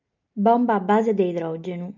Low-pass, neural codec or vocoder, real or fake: 7.2 kHz; none; real